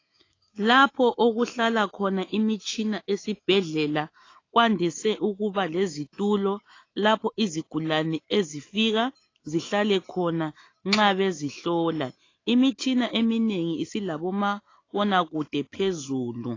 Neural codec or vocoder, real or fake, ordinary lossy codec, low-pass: autoencoder, 48 kHz, 128 numbers a frame, DAC-VAE, trained on Japanese speech; fake; AAC, 32 kbps; 7.2 kHz